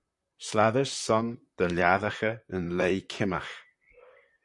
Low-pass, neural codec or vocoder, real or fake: 10.8 kHz; vocoder, 44.1 kHz, 128 mel bands, Pupu-Vocoder; fake